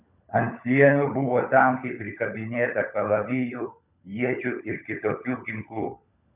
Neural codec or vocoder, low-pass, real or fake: codec, 16 kHz, 16 kbps, FunCodec, trained on LibriTTS, 50 frames a second; 3.6 kHz; fake